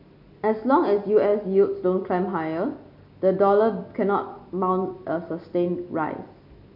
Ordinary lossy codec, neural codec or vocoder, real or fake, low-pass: none; none; real; 5.4 kHz